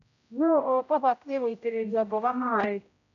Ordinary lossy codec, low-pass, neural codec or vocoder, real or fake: none; 7.2 kHz; codec, 16 kHz, 0.5 kbps, X-Codec, HuBERT features, trained on general audio; fake